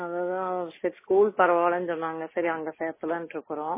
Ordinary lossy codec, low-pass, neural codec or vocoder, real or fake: MP3, 16 kbps; 3.6 kHz; none; real